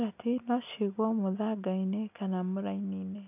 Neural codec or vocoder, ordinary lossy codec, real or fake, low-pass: none; none; real; 3.6 kHz